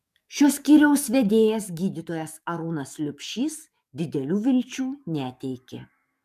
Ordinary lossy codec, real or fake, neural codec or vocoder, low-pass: MP3, 96 kbps; fake; codec, 44.1 kHz, 7.8 kbps, DAC; 14.4 kHz